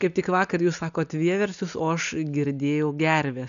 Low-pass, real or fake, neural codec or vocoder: 7.2 kHz; real; none